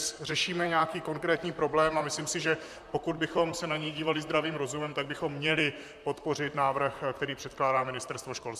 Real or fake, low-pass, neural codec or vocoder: fake; 14.4 kHz; vocoder, 44.1 kHz, 128 mel bands, Pupu-Vocoder